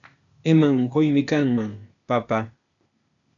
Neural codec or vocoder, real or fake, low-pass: codec, 16 kHz, 0.8 kbps, ZipCodec; fake; 7.2 kHz